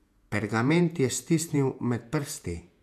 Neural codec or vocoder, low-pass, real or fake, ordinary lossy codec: vocoder, 48 kHz, 128 mel bands, Vocos; 14.4 kHz; fake; AAC, 96 kbps